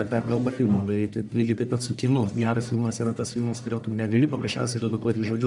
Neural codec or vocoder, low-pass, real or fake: codec, 44.1 kHz, 1.7 kbps, Pupu-Codec; 10.8 kHz; fake